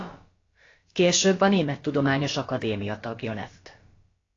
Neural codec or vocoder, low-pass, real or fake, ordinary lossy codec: codec, 16 kHz, about 1 kbps, DyCAST, with the encoder's durations; 7.2 kHz; fake; AAC, 32 kbps